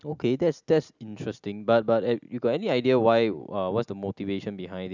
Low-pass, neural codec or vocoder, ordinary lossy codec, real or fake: 7.2 kHz; none; none; real